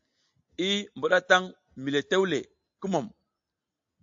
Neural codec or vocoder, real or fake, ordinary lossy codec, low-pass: none; real; AAC, 64 kbps; 7.2 kHz